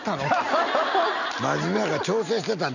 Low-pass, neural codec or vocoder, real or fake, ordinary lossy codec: 7.2 kHz; none; real; none